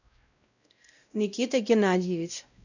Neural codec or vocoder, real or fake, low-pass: codec, 16 kHz, 0.5 kbps, X-Codec, WavLM features, trained on Multilingual LibriSpeech; fake; 7.2 kHz